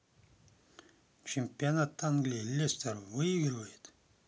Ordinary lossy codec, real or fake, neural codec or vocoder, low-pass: none; real; none; none